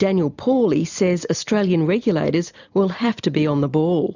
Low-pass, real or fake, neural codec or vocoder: 7.2 kHz; real; none